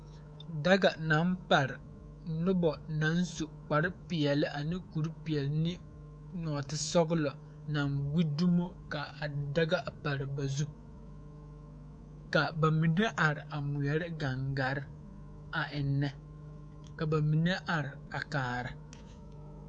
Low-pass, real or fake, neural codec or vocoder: 9.9 kHz; fake; codec, 24 kHz, 3.1 kbps, DualCodec